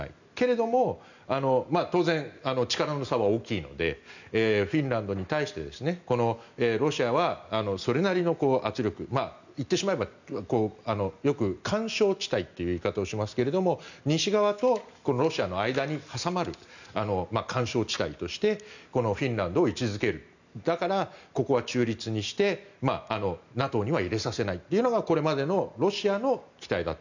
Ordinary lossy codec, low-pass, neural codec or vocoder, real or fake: none; 7.2 kHz; none; real